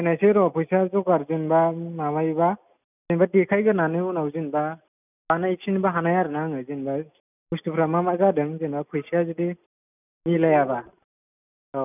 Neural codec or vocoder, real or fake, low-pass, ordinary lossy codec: none; real; 3.6 kHz; none